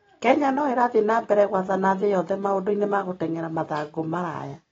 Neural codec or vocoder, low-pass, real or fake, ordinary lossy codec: none; 7.2 kHz; real; AAC, 24 kbps